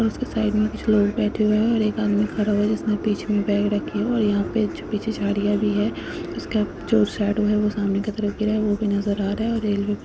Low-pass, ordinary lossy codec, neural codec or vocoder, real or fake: none; none; none; real